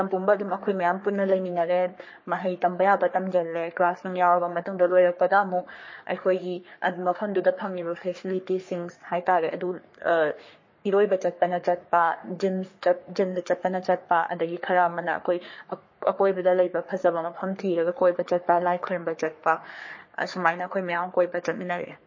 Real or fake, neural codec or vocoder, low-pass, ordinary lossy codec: fake; codec, 44.1 kHz, 3.4 kbps, Pupu-Codec; 7.2 kHz; MP3, 32 kbps